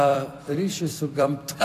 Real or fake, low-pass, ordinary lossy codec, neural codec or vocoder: fake; 14.4 kHz; AAC, 64 kbps; vocoder, 44.1 kHz, 128 mel bands every 256 samples, BigVGAN v2